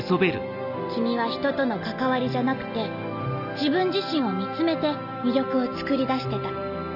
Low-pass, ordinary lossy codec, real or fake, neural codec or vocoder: 5.4 kHz; none; real; none